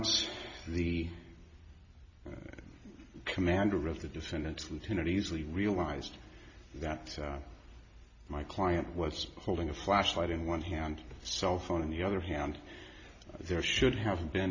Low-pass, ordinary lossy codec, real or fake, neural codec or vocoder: 7.2 kHz; AAC, 48 kbps; real; none